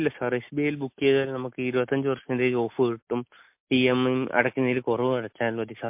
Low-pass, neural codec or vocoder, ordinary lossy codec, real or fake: 3.6 kHz; none; MP3, 32 kbps; real